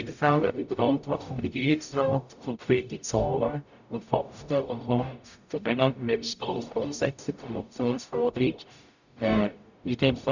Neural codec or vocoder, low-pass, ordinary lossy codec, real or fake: codec, 44.1 kHz, 0.9 kbps, DAC; 7.2 kHz; none; fake